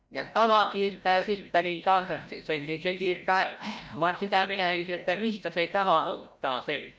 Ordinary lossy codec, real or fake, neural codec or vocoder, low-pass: none; fake; codec, 16 kHz, 0.5 kbps, FreqCodec, larger model; none